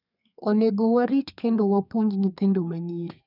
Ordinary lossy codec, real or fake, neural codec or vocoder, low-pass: none; fake; codec, 32 kHz, 1.9 kbps, SNAC; 5.4 kHz